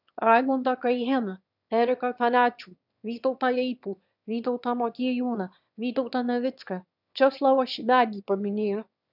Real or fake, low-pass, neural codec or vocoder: fake; 5.4 kHz; autoencoder, 22.05 kHz, a latent of 192 numbers a frame, VITS, trained on one speaker